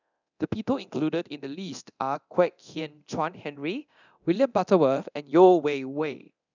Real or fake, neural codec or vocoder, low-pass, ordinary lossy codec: fake; codec, 24 kHz, 0.9 kbps, DualCodec; 7.2 kHz; none